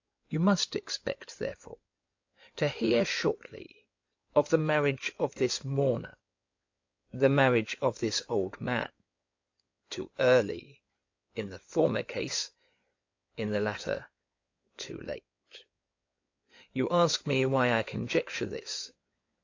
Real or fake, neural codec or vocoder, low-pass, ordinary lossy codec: fake; codec, 16 kHz in and 24 kHz out, 2.2 kbps, FireRedTTS-2 codec; 7.2 kHz; AAC, 48 kbps